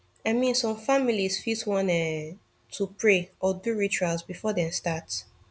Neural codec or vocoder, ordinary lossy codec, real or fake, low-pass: none; none; real; none